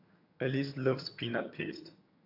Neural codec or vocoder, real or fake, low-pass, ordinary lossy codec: vocoder, 22.05 kHz, 80 mel bands, HiFi-GAN; fake; 5.4 kHz; AAC, 48 kbps